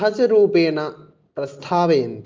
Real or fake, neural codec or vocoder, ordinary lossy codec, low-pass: real; none; Opus, 24 kbps; 7.2 kHz